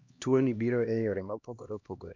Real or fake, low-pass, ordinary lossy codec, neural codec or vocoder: fake; 7.2 kHz; MP3, 64 kbps; codec, 16 kHz, 1 kbps, X-Codec, HuBERT features, trained on LibriSpeech